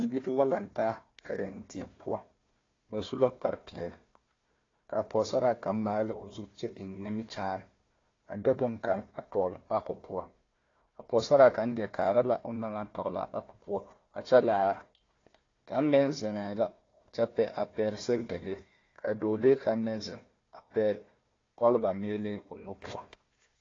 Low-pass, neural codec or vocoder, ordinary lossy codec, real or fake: 7.2 kHz; codec, 16 kHz, 1 kbps, FunCodec, trained on Chinese and English, 50 frames a second; AAC, 32 kbps; fake